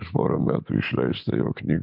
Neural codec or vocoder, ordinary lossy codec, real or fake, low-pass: none; MP3, 48 kbps; real; 5.4 kHz